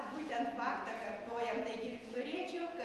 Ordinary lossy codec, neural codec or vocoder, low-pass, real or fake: Opus, 24 kbps; vocoder, 48 kHz, 128 mel bands, Vocos; 14.4 kHz; fake